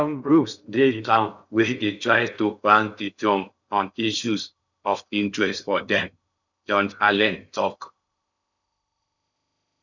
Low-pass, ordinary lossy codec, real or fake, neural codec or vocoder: 7.2 kHz; none; fake; codec, 16 kHz in and 24 kHz out, 0.6 kbps, FocalCodec, streaming, 2048 codes